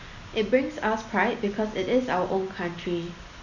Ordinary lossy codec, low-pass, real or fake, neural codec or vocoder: none; 7.2 kHz; real; none